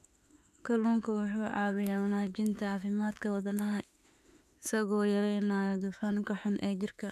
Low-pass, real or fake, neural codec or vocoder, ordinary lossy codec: 14.4 kHz; fake; autoencoder, 48 kHz, 32 numbers a frame, DAC-VAE, trained on Japanese speech; none